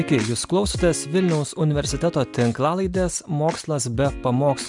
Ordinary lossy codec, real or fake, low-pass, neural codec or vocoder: MP3, 96 kbps; real; 10.8 kHz; none